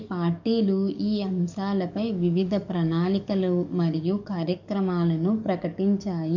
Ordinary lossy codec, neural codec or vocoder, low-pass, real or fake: none; codec, 44.1 kHz, 7.8 kbps, DAC; 7.2 kHz; fake